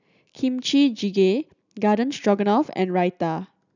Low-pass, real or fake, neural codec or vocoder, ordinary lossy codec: 7.2 kHz; real; none; none